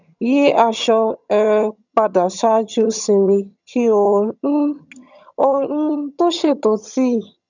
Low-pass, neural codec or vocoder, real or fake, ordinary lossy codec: 7.2 kHz; vocoder, 22.05 kHz, 80 mel bands, HiFi-GAN; fake; none